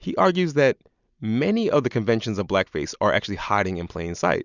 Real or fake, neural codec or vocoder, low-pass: real; none; 7.2 kHz